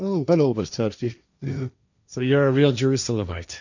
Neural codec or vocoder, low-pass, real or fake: codec, 16 kHz, 1.1 kbps, Voila-Tokenizer; 7.2 kHz; fake